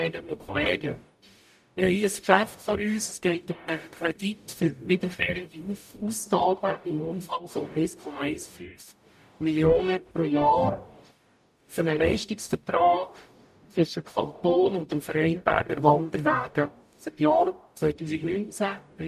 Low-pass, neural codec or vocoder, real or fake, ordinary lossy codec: 14.4 kHz; codec, 44.1 kHz, 0.9 kbps, DAC; fake; MP3, 96 kbps